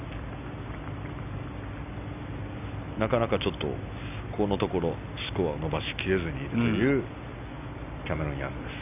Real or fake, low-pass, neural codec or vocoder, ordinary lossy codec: real; 3.6 kHz; none; none